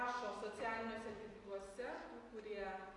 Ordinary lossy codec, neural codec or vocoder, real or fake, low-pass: AAC, 32 kbps; none; real; 10.8 kHz